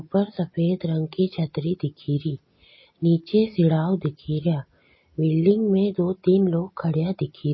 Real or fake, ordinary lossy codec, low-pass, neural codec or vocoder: real; MP3, 24 kbps; 7.2 kHz; none